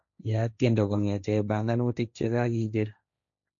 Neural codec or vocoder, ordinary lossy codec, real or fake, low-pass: codec, 16 kHz, 1.1 kbps, Voila-Tokenizer; none; fake; 7.2 kHz